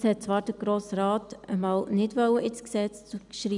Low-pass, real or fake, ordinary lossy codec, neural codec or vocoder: 10.8 kHz; real; none; none